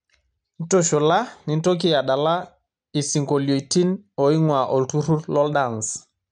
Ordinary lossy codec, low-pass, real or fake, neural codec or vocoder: none; 9.9 kHz; real; none